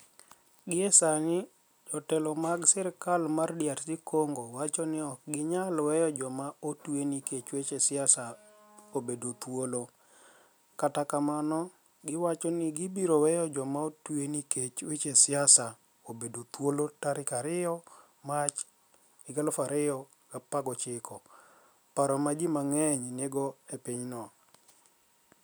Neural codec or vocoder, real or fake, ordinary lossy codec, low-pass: none; real; none; none